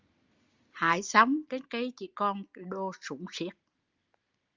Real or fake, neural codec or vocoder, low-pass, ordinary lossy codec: real; none; 7.2 kHz; Opus, 32 kbps